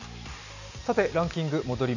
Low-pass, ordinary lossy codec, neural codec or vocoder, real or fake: 7.2 kHz; none; none; real